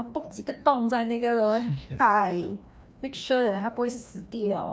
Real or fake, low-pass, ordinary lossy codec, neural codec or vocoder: fake; none; none; codec, 16 kHz, 1 kbps, FreqCodec, larger model